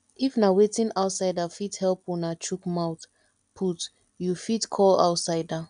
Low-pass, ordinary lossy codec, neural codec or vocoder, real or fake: 9.9 kHz; none; none; real